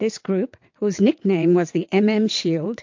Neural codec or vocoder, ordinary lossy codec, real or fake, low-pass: vocoder, 22.05 kHz, 80 mel bands, WaveNeXt; MP3, 48 kbps; fake; 7.2 kHz